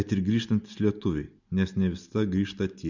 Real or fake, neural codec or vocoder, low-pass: real; none; 7.2 kHz